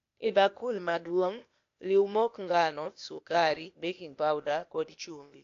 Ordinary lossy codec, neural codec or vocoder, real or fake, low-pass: AAC, 48 kbps; codec, 16 kHz, 0.8 kbps, ZipCodec; fake; 7.2 kHz